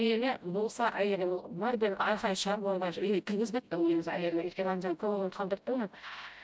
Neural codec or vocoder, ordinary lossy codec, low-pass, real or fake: codec, 16 kHz, 0.5 kbps, FreqCodec, smaller model; none; none; fake